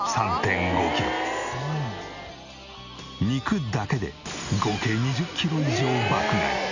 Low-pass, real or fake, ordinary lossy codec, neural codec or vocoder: 7.2 kHz; real; none; none